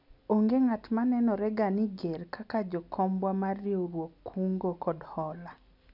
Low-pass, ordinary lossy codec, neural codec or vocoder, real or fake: 5.4 kHz; none; none; real